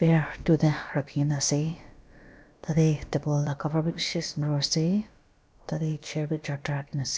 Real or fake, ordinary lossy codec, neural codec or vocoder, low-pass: fake; none; codec, 16 kHz, about 1 kbps, DyCAST, with the encoder's durations; none